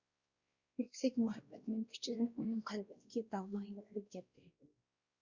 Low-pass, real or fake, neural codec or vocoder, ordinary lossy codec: 7.2 kHz; fake; codec, 16 kHz, 1 kbps, X-Codec, WavLM features, trained on Multilingual LibriSpeech; Opus, 64 kbps